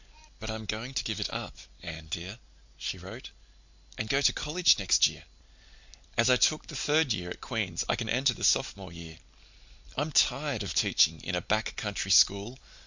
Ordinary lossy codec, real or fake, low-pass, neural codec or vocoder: Opus, 64 kbps; real; 7.2 kHz; none